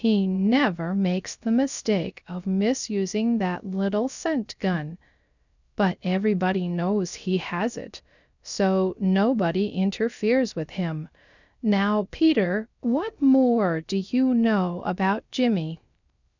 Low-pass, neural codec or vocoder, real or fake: 7.2 kHz; codec, 16 kHz, 0.3 kbps, FocalCodec; fake